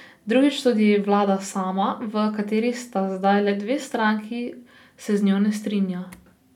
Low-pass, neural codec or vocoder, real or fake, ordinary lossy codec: 19.8 kHz; none; real; none